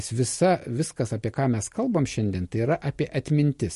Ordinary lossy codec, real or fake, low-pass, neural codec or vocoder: MP3, 48 kbps; real; 14.4 kHz; none